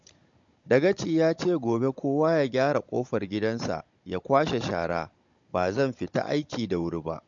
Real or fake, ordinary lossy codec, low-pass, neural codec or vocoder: fake; MP3, 48 kbps; 7.2 kHz; codec, 16 kHz, 16 kbps, FunCodec, trained on Chinese and English, 50 frames a second